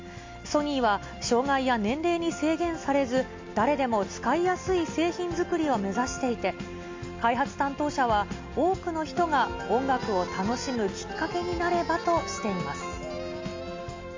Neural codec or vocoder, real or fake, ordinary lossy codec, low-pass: none; real; MP3, 48 kbps; 7.2 kHz